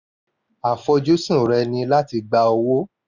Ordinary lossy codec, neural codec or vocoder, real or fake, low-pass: none; none; real; 7.2 kHz